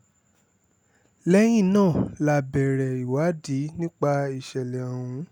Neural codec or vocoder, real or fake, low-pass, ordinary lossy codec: none; real; 19.8 kHz; none